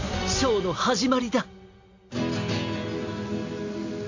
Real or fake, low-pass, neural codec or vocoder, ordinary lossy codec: real; 7.2 kHz; none; AAC, 48 kbps